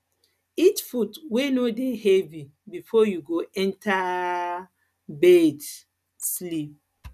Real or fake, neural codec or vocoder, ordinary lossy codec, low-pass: real; none; none; 14.4 kHz